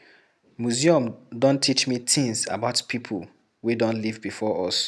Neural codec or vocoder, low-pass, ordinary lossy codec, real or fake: none; none; none; real